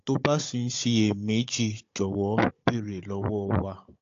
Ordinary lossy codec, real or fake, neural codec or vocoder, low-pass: AAC, 48 kbps; fake; codec, 16 kHz, 16 kbps, FunCodec, trained on Chinese and English, 50 frames a second; 7.2 kHz